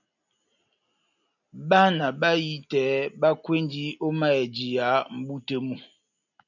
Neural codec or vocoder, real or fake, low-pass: none; real; 7.2 kHz